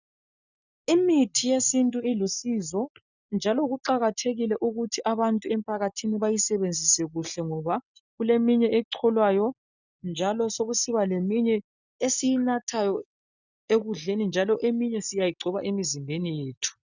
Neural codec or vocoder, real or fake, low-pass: none; real; 7.2 kHz